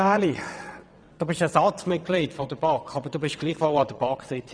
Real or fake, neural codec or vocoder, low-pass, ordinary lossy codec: fake; vocoder, 22.05 kHz, 80 mel bands, WaveNeXt; 9.9 kHz; none